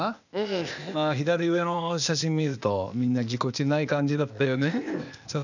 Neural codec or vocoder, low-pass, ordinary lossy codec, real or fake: codec, 16 kHz, 0.8 kbps, ZipCodec; 7.2 kHz; none; fake